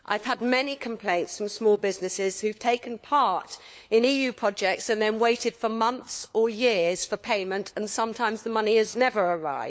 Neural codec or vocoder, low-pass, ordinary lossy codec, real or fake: codec, 16 kHz, 4 kbps, FunCodec, trained on LibriTTS, 50 frames a second; none; none; fake